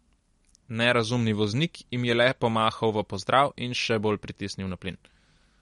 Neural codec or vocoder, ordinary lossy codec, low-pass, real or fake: none; MP3, 48 kbps; 19.8 kHz; real